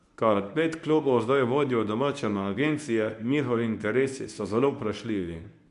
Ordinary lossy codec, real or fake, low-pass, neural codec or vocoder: none; fake; 10.8 kHz; codec, 24 kHz, 0.9 kbps, WavTokenizer, medium speech release version 1